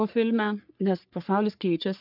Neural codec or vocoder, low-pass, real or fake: codec, 44.1 kHz, 3.4 kbps, Pupu-Codec; 5.4 kHz; fake